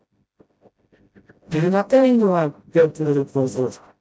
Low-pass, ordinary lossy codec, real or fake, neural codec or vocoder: none; none; fake; codec, 16 kHz, 0.5 kbps, FreqCodec, smaller model